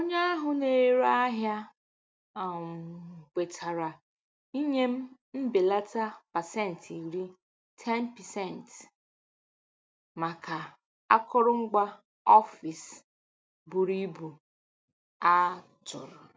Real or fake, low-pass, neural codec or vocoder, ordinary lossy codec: real; none; none; none